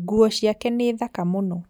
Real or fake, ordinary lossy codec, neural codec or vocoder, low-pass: real; none; none; none